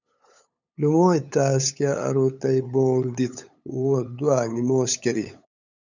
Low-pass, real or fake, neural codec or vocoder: 7.2 kHz; fake; codec, 16 kHz, 8 kbps, FunCodec, trained on LibriTTS, 25 frames a second